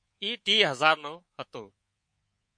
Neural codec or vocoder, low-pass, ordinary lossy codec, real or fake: codec, 24 kHz, 3.1 kbps, DualCodec; 9.9 kHz; MP3, 48 kbps; fake